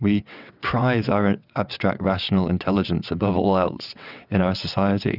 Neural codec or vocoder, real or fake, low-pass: vocoder, 22.05 kHz, 80 mel bands, WaveNeXt; fake; 5.4 kHz